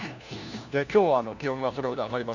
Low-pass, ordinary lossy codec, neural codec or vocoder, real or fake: 7.2 kHz; none; codec, 16 kHz, 1 kbps, FunCodec, trained on LibriTTS, 50 frames a second; fake